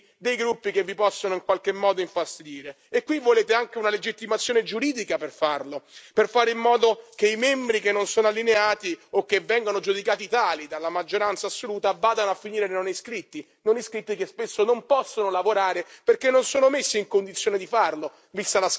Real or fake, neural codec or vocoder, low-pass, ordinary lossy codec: real; none; none; none